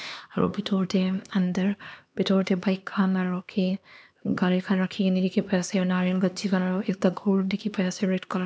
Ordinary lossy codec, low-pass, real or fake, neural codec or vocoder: none; none; fake; codec, 16 kHz, 2 kbps, X-Codec, HuBERT features, trained on LibriSpeech